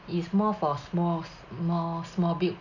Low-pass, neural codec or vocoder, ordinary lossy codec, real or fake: 7.2 kHz; none; none; real